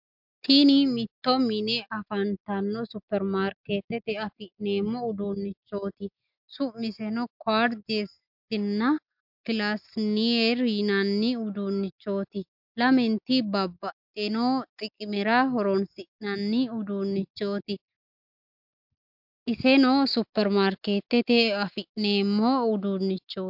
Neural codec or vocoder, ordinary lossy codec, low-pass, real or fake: none; MP3, 48 kbps; 5.4 kHz; real